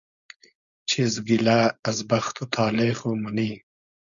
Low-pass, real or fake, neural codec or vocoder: 7.2 kHz; fake; codec, 16 kHz, 4.8 kbps, FACodec